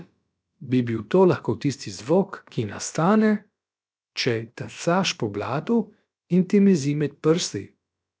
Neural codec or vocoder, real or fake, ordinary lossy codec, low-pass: codec, 16 kHz, about 1 kbps, DyCAST, with the encoder's durations; fake; none; none